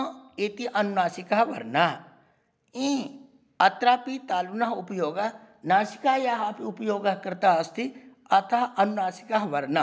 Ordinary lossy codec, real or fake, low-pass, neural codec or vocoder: none; real; none; none